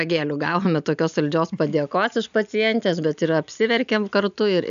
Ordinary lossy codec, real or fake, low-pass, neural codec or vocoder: AAC, 96 kbps; real; 7.2 kHz; none